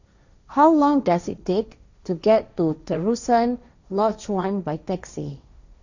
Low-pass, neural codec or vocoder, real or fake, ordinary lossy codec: 7.2 kHz; codec, 16 kHz, 1.1 kbps, Voila-Tokenizer; fake; none